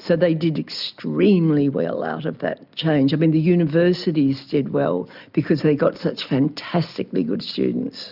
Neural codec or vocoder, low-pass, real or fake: none; 5.4 kHz; real